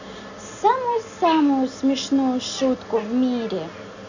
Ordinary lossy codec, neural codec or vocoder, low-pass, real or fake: none; none; 7.2 kHz; real